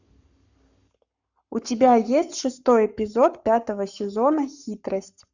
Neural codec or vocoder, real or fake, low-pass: codec, 44.1 kHz, 7.8 kbps, Pupu-Codec; fake; 7.2 kHz